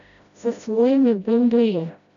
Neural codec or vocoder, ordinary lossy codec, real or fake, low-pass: codec, 16 kHz, 0.5 kbps, FreqCodec, smaller model; none; fake; 7.2 kHz